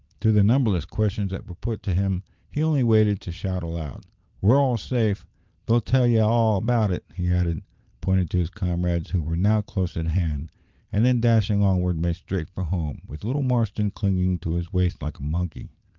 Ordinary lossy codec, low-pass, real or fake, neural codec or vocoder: Opus, 32 kbps; 7.2 kHz; real; none